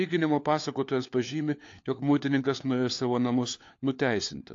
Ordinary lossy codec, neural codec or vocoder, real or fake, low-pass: AAC, 48 kbps; codec, 16 kHz, 4 kbps, FunCodec, trained on LibriTTS, 50 frames a second; fake; 7.2 kHz